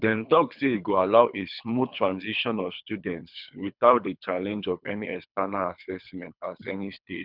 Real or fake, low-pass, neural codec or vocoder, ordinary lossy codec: fake; 5.4 kHz; codec, 24 kHz, 3 kbps, HILCodec; none